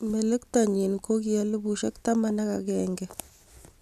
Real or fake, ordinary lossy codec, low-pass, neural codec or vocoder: real; none; 14.4 kHz; none